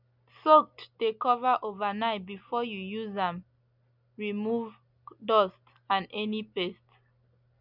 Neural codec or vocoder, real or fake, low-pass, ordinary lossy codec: none; real; 5.4 kHz; none